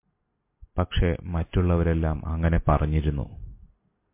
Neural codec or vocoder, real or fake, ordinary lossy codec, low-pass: none; real; MP3, 24 kbps; 3.6 kHz